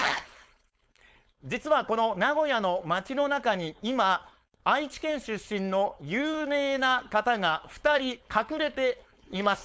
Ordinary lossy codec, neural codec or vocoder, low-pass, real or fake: none; codec, 16 kHz, 4.8 kbps, FACodec; none; fake